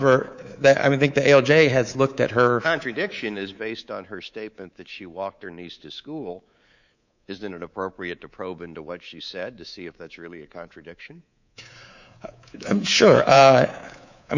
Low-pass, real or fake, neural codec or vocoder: 7.2 kHz; fake; codec, 24 kHz, 3.1 kbps, DualCodec